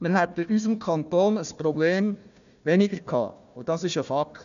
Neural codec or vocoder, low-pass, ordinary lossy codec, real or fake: codec, 16 kHz, 1 kbps, FunCodec, trained on Chinese and English, 50 frames a second; 7.2 kHz; none; fake